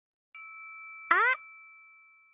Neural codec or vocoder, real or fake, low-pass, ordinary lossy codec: none; real; 3.6 kHz; none